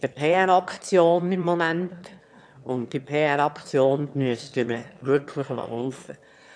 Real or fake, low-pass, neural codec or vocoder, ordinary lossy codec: fake; none; autoencoder, 22.05 kHz, a latent of 192 numbers a frame, VITS, trained on one speaker; none